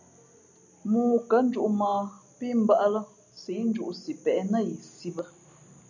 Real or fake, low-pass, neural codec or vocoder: real; 7.2 kHz; none